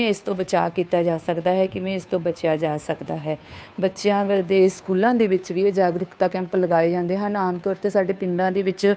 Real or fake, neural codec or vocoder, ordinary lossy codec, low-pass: fake; codec, 16 kHz, 2 kbps, FunCodec, trained on Chinese and English, 25 frames a second; none; none